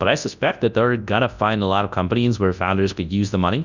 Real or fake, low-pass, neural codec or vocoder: fake; 7.2 kHz; codec, 24 kHz, 0.9 kbps, WavTokenizer, large speech release